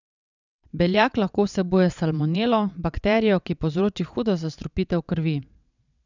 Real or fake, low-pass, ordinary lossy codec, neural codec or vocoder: fake; 7.2 kHz; none; vocoder, 22.05 kHz, 80 mel bands, WaveNeXt